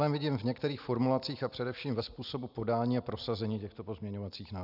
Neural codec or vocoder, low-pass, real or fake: none; 5.4 kHz; real